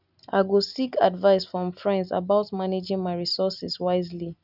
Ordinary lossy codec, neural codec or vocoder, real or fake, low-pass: none; none; real; 5.4 kHz